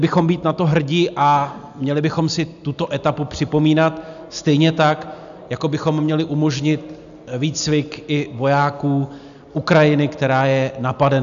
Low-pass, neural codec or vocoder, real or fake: 7.2 kHz; none; real